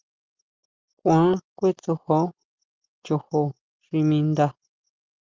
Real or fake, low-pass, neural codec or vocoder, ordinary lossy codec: real; 7.2 kHz; none; Opus, 24 kbps